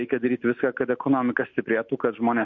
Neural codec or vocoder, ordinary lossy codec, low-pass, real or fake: none; MP3, 48 kbps; 7.2 kHz; real